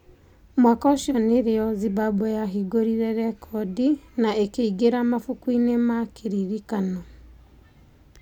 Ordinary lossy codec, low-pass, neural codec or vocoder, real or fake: none; 19.8 kHz; none; real